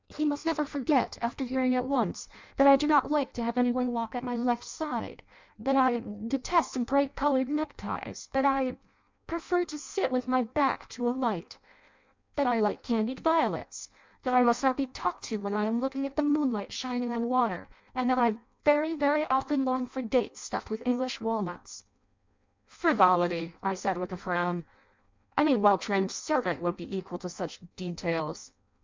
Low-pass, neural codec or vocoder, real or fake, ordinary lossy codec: 7.2 kHz; codec, 16 kHz in and 24 kHz out, 0.6 kbps, FireRedTTS-2 codec; fake; MP3, 64 kbps